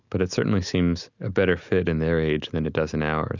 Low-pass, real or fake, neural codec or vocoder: 7.2 kHz; real; none